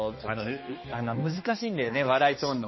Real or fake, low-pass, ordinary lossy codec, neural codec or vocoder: fake; 7.2 kHz; MP3, 24 kbps; codec, 16 kHz, 2 kbps, X-Codec, HuBERT features, trained on balanced general audio